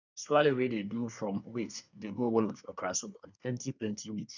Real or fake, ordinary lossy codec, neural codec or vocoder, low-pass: fake; none; codec, 24 kHz, 1 kbps, SNAC; 7.2 kHz